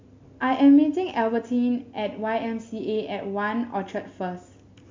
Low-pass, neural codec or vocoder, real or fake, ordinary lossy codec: 7.2 kHz; none; real; MP3, 48 kbps